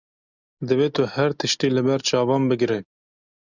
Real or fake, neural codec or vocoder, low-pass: real; none; 7.2 kHz